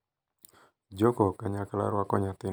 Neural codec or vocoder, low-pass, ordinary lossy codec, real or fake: none; none; none; real